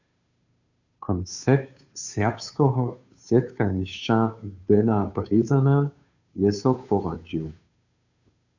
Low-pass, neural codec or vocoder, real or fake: 7.2 kHz; codec, 16 kHz, 2 kbps, FunCodec, trained on Chinese and English, 25 frames a second; fake